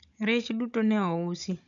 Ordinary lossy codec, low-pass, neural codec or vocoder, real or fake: none; 7.2 kHz; codec, 16 kHz, 16 kbps, FunCodec, trained on Chinese and English, 50 frames a second; fake